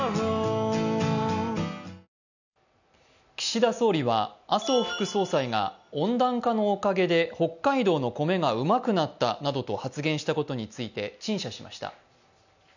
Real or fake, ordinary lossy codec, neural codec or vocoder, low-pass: real; none; none; 7.2 kHz